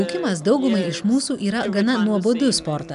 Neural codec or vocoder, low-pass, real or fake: none; 10.8 kHz; real